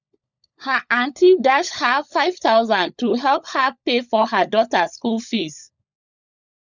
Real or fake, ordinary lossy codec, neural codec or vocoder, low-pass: fake; none; codec, 16 kHz, 16 kbps, FunCodec, trained on LibriTTS, 50 frames a second; 7.2 kHz